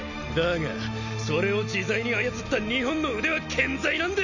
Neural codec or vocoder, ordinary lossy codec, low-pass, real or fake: none; none; 7.2 kHz; real